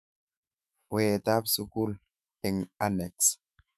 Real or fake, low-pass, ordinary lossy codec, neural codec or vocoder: fake; 14.4 kHz; none; autoencoder, 48 kHz, 128 numbers a frame, DAC-VAE, trained on Japanese speech